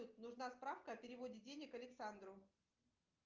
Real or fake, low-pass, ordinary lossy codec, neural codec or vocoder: real; 7.2 kHz; Opus, 32 kbps; none